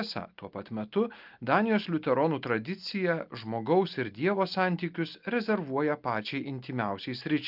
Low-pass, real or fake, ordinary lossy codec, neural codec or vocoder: 5.4 kHz; real; Opus, 24 kbps; none